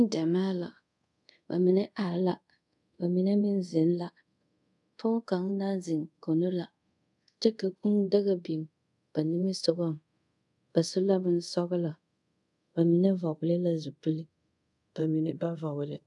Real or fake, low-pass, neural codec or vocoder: fake; 10.8 kHz; codec, 24 kHz, 0.5 kbps, DualCodec